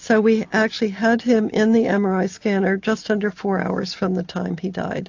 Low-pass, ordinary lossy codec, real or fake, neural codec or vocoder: 7.2 kHz; AAC, 48 kbps; real; none